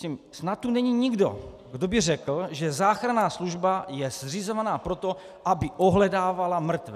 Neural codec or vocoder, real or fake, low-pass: none; real; 14.4 kHz